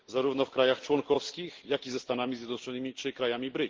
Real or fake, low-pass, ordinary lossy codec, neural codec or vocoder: real; 7.2 kHz; Opus, 16 kbps; none